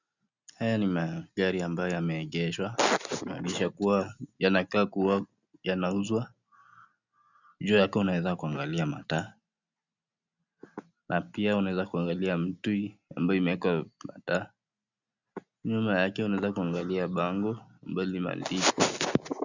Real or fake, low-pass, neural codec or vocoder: real; 7.2 kHz; none